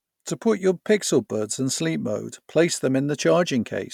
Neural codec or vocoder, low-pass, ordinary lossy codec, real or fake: none; 19.8 kHz; none; real